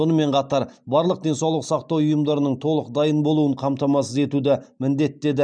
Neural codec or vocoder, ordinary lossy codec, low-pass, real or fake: none; none; 9.9 kHz; real